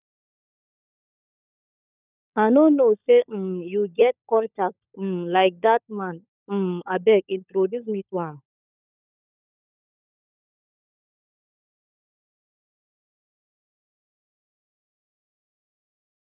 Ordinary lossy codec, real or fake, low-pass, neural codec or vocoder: none; fake; 3.6 kHz; codec, 16 kHz, 16 kbps, FunCodec, trained on LibriTTS, 50 frames a second